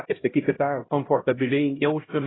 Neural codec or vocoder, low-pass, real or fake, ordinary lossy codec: codec, 16 kHz, 0.5 kbps, X-Codec, HuBERT features, trained on LibriSpeech; 7.2 kHz; fake; AAC, 16 kbps